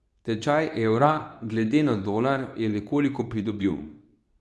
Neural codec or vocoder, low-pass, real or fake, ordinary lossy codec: codec, 24 kHz, 0.9 kbps, WavTokenizer, medium speech release version 2; none; fake; none